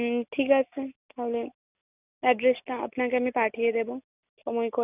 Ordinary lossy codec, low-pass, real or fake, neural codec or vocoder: none; 3.6 kHz; real; none